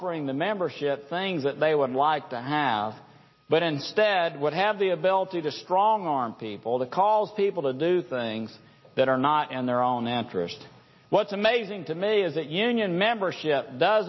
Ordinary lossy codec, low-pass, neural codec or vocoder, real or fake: MP3, 24 kbps; 7.2 kHz; none; real